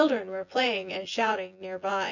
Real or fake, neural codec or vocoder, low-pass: fake; vocoder, 24 kHz, 100 mel bands, Vocos; 7.2 kHz